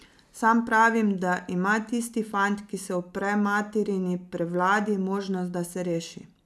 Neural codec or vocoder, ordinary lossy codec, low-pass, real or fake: none; none; none; real